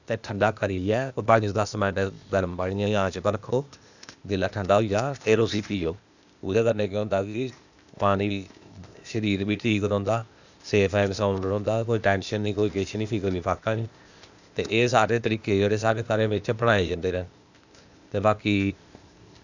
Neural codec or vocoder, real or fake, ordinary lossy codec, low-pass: codec, 16 kHz, 0.8 kbps, ZipCodec; fake; none; 7.2 kHz